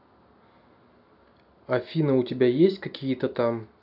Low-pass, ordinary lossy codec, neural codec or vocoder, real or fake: 5.4 kHz; none; none; real